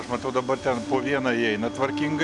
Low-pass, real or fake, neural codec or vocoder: 10.8 kHz; real; none